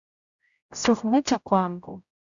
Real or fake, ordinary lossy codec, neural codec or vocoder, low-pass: fake; Opus, 64 kbps; codec, 16 kHz, 0.5 kbps, X-Codec, HuBERT features, trained on general audio; 7.2 kHz